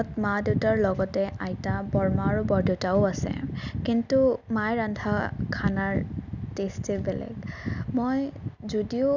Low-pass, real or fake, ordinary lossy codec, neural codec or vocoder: 7.2 kHz; real; none; none